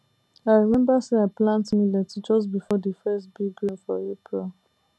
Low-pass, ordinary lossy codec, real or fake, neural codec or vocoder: none; none; real; none